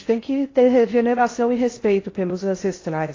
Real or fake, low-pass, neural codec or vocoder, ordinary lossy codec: fake; 7.2 kHz; codec, 16 kHz in and 24 kHz out, 0.6 kbps, FocalCodec, streaming, 4096 codes; AAC, 32 kbps